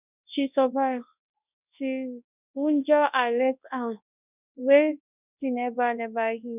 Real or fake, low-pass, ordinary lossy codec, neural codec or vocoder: fake; 3.6 kHz; none; codec, 24 kHz, 0.9 kbps, WavTokenizer, large speech release